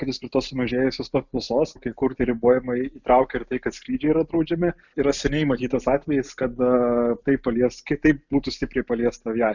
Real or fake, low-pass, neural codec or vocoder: real; 7.2 kHz; none